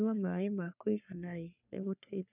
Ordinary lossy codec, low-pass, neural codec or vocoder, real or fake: none; 3.6 kHz; codec, 44.1 kHz, 3.4 kbps, Pupu-Codec; fake